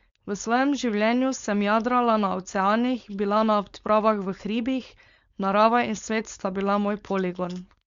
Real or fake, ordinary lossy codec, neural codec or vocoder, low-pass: fake; Opus, 64 kbps; codec, 16 kHz, 4.8 kbps, FACodec; 7.2 kHz